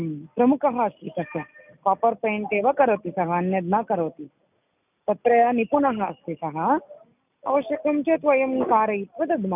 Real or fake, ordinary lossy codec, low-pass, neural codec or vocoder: real; none; 3.6 kHz; none